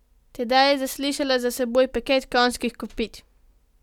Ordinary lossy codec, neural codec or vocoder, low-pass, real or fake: none; none; 19.8 kHz; real